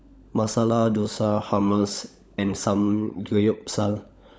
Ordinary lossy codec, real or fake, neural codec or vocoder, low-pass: none; fake; codec, 16 kHz, 16 kbps, FunCodec, trained on LibriTTS, 50 frames a second; none